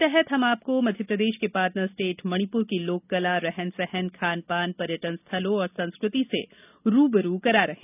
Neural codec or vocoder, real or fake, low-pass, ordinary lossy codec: none; real; 3.6 kHz; none